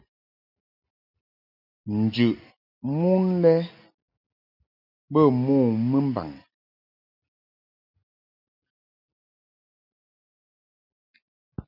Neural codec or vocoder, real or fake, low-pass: none; real; 5.4 kHz